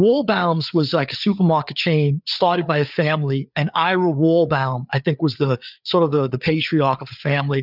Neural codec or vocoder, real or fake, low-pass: codec, 16 kHz, 4 kbps, FreqCodec, larger model; fake; 5.4 kHz